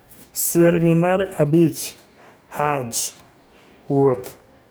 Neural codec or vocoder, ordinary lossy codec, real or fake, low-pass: codec, 44.1 kHz, 2.6 kbps, DAC; none; fake; none